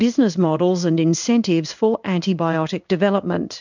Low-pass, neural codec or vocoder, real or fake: 7.2 kHz; codec, 16 kHz in and 24 kHz out, 1 kbps, XY-Tokenizer; fake